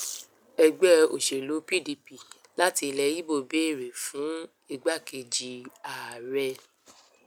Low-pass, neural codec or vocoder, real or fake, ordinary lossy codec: 19.8 kHz; none; real; none